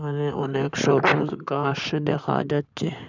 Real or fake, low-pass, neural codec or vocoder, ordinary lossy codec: fake; 7.2 kHz; codec, 16 kHz in and 24 kHz out, 2.2 kbps, FireRedTTS-2 codec; none